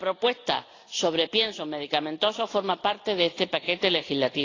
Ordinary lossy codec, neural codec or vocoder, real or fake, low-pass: AAC, 32 kbps; none; real; 7.2 kHz